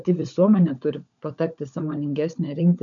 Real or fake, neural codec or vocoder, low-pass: fake; codec, 16 kHz, 16 kbps, FunCodec, trained on LibriTTS, 50 frames a second; 7.2 kHz